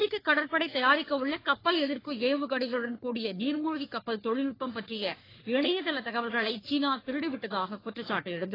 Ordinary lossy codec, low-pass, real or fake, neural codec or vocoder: AAC, 24 kbps; 5.4 kHz; fake; codec, 44.1 kHz, 3.4 kbps, Pupu-Codec